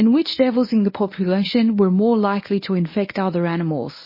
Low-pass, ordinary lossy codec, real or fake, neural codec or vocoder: 5.4 kHz; MP3, 24 kbps; fake; codec, 24 kHz, 0.9 kbps, WavTokenizer, medium speech release version 1